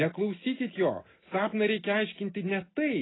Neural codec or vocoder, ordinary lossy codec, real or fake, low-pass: none; AAC, 16 kbps; real; 7.2 kHz